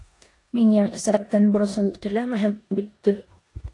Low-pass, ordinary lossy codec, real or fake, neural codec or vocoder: 10.8 kHz; AAC, 48 kbps; fake; codec, 16 kHz in and 24 kHz out, 0.9 kbps, LongCat-Audio-Codec, four codebook decoder